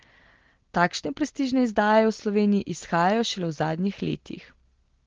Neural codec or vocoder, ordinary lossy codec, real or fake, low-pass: none; Opus, 16 kbps; real; 7.2 kHz